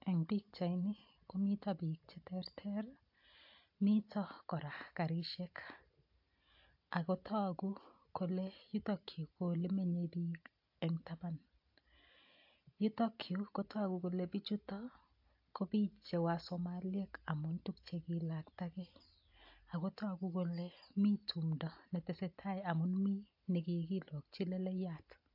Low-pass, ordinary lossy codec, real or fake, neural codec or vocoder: 5.4 kHz; AAC, 48 kbps; real; none